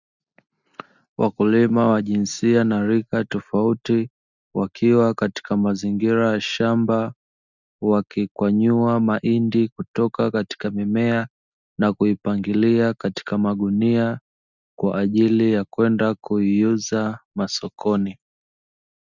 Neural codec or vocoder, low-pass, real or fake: none; 7.2 kHz; real